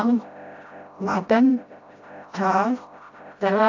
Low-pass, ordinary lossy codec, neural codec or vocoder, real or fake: 7.2 kHz; AAC, 48 kbps; codec, 16 kHz, 0.5 kbps, FreqCodec, smaller model; fake